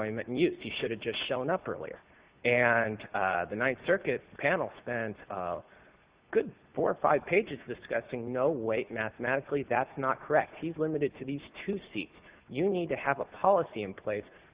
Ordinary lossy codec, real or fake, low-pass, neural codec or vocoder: Opus, 64 kbps; real; 3.6 kHz; none